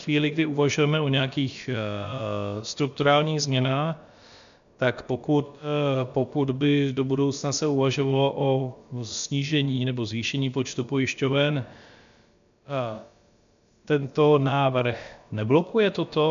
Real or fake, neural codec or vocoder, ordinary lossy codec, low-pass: fake; codec, 16 kHz, about 1 kbps, DyCAST, with the encoder's durations; MP3, 64 kbps; 7.2 kHz